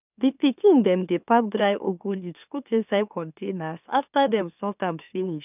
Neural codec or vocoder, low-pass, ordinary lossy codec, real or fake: autoencoder, 44.1 kHz, a latent of 192 numbers a frame, MeloTTS; 3.6 kHz; none; fake